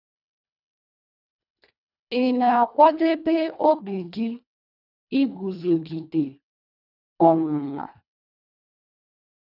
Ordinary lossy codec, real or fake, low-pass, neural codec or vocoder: none; fake; 5.4 kHz; codec, 24 kHz, 1.5 kbps, HILCodec